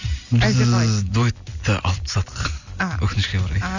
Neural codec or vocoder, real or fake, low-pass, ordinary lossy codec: none; real; 7.2 kHz; none